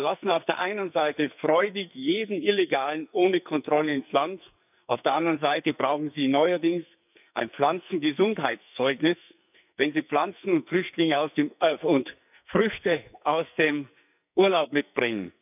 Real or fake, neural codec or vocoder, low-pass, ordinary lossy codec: fake; codec, 44.1 kHz, 2.6 kbps, SNAC; 3.6 kHz; none